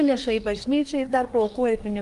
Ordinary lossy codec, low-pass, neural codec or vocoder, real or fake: Opus, 32 kbps; 10.8 kHz; codec, 24 kHz, 1 kbps, SNAC; fake